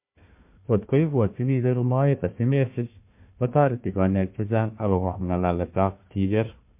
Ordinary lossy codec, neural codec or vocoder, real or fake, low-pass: MP3, 32 kbps; codec, 16 kHz, 1 kbps, FunCodec, trained on Chinese and English, 50 frames a second; fake; 3.6 kHz